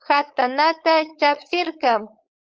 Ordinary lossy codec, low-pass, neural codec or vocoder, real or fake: Opus, 24 kbps; 7.2 kHz; codec, 16 kHz, 4.8 kbps, FACodec; fake